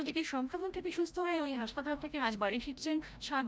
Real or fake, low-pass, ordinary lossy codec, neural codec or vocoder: fake; none; none; codec, 16 kHz, 0.5 kbps, FreqCodec, larger model